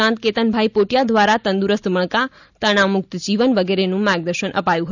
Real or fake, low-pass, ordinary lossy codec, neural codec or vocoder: real; 7.2 kHz; none; none